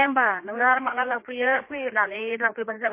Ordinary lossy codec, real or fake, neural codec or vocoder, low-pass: MP3, 24 kbps; fake; codec, 16 kHz, 2 kbps, FreqCodec, larger model; 3.6 kHz